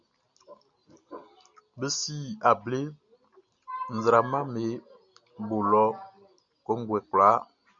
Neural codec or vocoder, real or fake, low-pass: none; real; 7.2 kHz